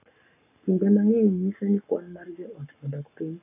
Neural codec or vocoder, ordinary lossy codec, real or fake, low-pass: codec, 44.1 kHz, 7.8 kbps, Pupu-Codec; AAC, 24 kbps; fake; 3.6 kHz